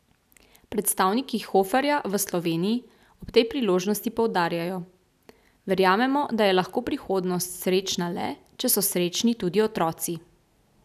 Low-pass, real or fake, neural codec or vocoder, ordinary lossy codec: 14.4 kHz; real; none; none